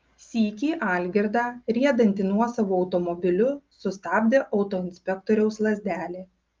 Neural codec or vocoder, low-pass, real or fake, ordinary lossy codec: none; 7.2 kHz; real; Opus, 32 kbps